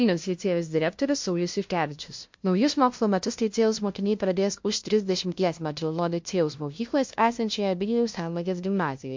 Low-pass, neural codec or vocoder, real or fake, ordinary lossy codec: 7.2 kHz; codec, 16 kHz, 0.5 kbps, FunCodec, trained on LibriTTS, 25 frames a second; fake; MP3, 48 kbps